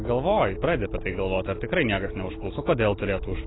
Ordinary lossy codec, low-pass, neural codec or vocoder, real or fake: AAC, 16 kbps; 7.2 kHz; none; real